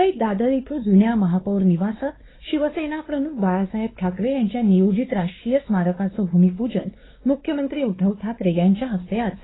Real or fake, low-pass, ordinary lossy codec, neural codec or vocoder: fake; 7.2 kHz; AAC, 16 kbps; codec, 16 kHz, 2 kbps, X-Codec, WavLM features, trained on Multilingual LibriSpeech